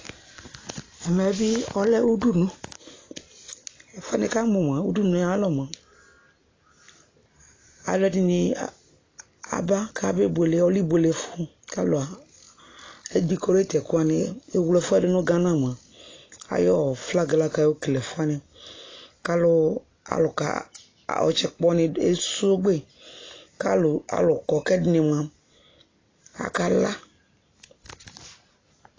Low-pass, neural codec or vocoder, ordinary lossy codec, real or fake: 7.2 kHz; none; AAC, 32 kbps; real